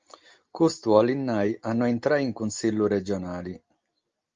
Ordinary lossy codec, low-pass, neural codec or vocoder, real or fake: Opus, 32 kbps; 7.2 kHz; none; real